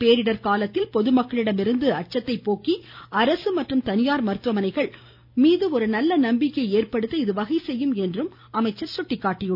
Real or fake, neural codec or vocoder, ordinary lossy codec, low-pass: real; none; none; 5.4 kHz